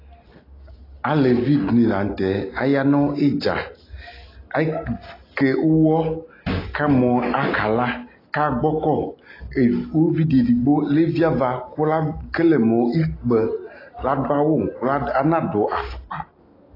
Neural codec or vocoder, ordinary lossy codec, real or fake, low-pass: none; AAC, 24 kbps; real; 5.4 kHz